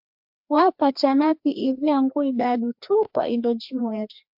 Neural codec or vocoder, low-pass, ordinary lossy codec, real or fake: codec, 16 kHz, 2 kbps, FreqCodec, larger model; 5.4 kHz; MP3, 48 kbps; fake